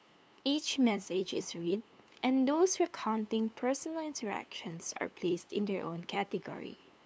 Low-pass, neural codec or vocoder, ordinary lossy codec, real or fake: none; codec, 16 kHz, 2 kbps, FunCodec, trained on LibriTTS, 25 frames a second; none; fake